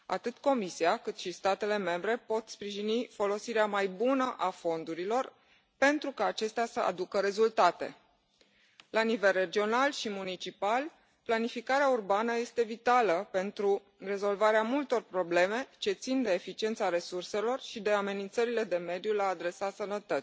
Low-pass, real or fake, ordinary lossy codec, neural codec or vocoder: none; real; none; none